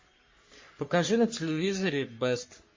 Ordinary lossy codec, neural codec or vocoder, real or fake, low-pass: MP3, 32 kbps; codec, 44.1 kHz, 3.4 kbps, Pupu-Codec; fake; 7.2 kHz